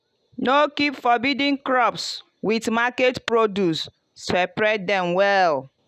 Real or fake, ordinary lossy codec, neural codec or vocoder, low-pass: real; none; none; 14.4 kHz